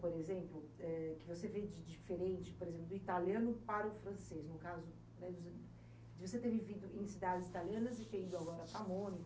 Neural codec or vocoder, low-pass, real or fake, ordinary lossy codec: none; none; real; none